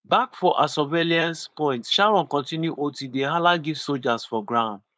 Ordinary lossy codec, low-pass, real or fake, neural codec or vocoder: none; none; fake; codec, 16 kHz, 4.8 kbps, FACodec